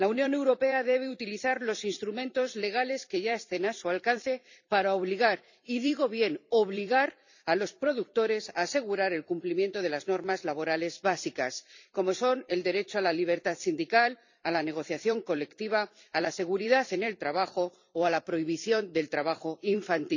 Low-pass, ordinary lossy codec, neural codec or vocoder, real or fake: 7.2 kHz; none; vocoder, 44.1 kHz, 128 mel bands every 256 samples, BigVGAN v2; fake